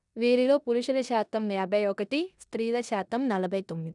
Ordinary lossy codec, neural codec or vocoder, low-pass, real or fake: none; codec, 16 kHz in and 24 kHz out, 0.9 kbps, LongCat-Audio-Codec, four codebook decoder; 10.8 kHz; fake